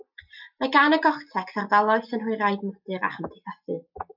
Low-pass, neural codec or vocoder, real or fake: 5.4 kHz; none; real